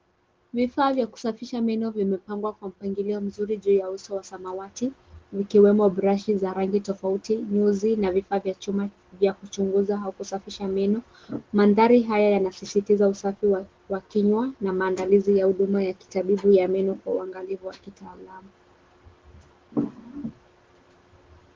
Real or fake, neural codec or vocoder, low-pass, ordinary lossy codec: real; none; 7.2 kHz; Opus, 16 kbps